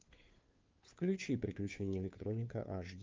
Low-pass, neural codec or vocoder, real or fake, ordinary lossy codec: 7.2 kHz; codec, 16 kHz, 2 kbps, FunCodec, trained on Chinese and English, 25 frames a second; fake; Opus, 32 kbps